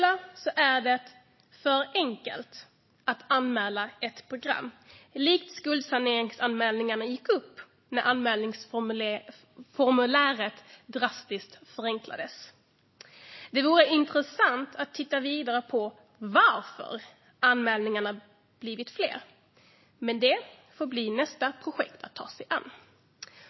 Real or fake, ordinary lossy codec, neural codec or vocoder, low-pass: real; MP3, 24 kbps; none; 7.2 kHz